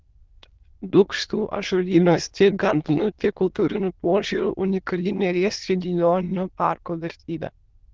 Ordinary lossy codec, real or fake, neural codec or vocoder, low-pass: Opus, 16 kbps; fake; autoencoder, 22.05 kHz, a latent of 192 numbers a frame, VITS, trained on many speakers; 7.2 kHz